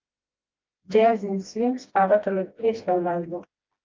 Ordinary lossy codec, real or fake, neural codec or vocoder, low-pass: Opus, 32 kbps; fake; codec, 16 kHz, 1 kbps, FreqCodec, smaller model; 7.2 kHz